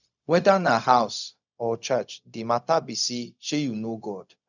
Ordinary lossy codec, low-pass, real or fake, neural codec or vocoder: none; 7.2 kHz; fake; codec, 16 kHz, 0.4 kbps, LongCat-Audio-Codec